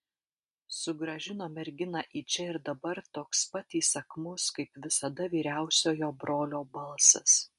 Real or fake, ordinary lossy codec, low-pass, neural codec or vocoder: real; MP3, 64 kbps; 10.8 kHz; none